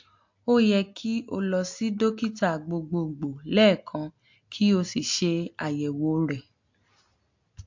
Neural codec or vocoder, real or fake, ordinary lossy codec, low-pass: none; real; MP3, 48 kbps; 7.2 kHz